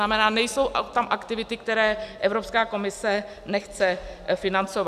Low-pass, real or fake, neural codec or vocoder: 14.4 kHz; real; none